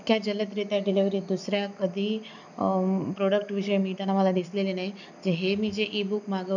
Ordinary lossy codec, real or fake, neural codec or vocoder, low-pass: none; real; none; 7.2 kHz